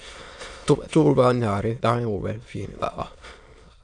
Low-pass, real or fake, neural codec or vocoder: 9.9 kHz; fake; autoencoder, 22.05 kHz, a latent of 192 numbers a frame, VITS, trained on many speakers